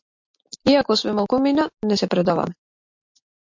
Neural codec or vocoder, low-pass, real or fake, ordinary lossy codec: none; 7.2 kHz; real; MP3, 48 kbps